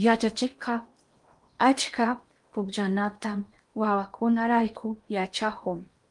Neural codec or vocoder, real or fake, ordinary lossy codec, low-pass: codec, 16 kHz in and 24 kHz out, 0.8 kbps, FocalCodec, streaming, 65536 codes; fake; Opus, 32 kbps; 10.8 kHz